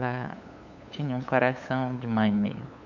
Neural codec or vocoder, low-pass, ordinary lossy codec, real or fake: codec, 16 kHz, 8 kbps, FunCodec, trained on LibriTTS, 25 frames a second; 7.2 kHz; none; fake